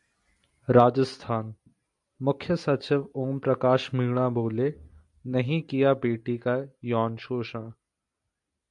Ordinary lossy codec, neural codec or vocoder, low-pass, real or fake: MP3, 64 kbps; none; 10.8 kHz; real